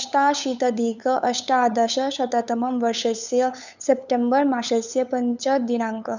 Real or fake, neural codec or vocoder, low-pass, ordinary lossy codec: fake; codec, 16 kHz, 8 kbps, FunCodec, trained on LibriTTS, 25 frames a second; 7.2 kHz; none